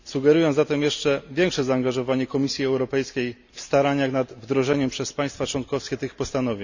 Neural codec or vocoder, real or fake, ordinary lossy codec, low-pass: none; real; none; 7.2 kHz